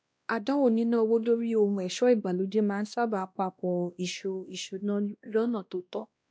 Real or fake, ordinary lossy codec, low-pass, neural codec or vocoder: fake; none; none; codec, 16 kHz, 1 kbps, X-Codec, WavLM features, trained on Multilingual LibriSpeech